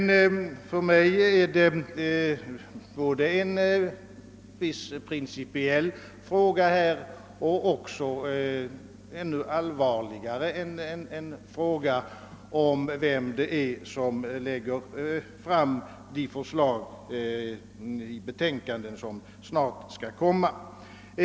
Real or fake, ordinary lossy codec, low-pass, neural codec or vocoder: real; none; none; none